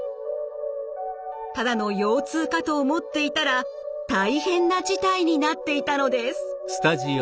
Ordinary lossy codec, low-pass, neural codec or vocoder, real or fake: none; none; none; real